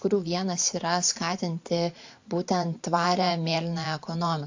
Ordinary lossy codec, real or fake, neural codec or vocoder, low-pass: AAC, 48 kbps; fake; vocoder, 44.1 kHz, 128 mel bands every 512 samples, BigVGAN v2; 7.2 kHz